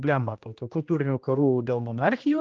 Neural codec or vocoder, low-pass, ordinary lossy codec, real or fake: codec, 16 kHz, 1 kbps, X-Codec, HuBERT features, trained on general audio; 7.2 kHz; Opus, 24 kbps; fake